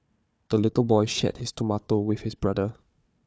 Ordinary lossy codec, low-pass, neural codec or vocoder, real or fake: none; none; codec, 16 kHz, 4 kbps, FunCodec, trained on Chinese and English, 50 frames a second; fake